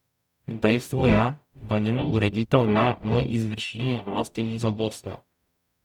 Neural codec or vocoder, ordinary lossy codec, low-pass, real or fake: codec, 44.1 kHz, 0.9 kbps, DAC; none; 19.8 kHz; fake